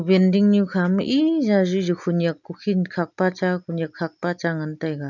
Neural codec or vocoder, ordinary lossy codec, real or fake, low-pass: none; none; real; 7.2 kHz